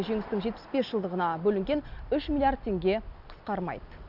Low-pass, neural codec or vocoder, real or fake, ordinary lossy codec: 5.4 kHz; none; real; none